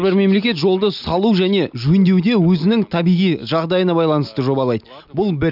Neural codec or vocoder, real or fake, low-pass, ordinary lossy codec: none; real; 5.4 kHz; none